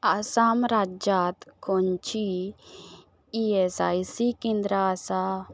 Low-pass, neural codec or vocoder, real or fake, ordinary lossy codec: none; none; real; none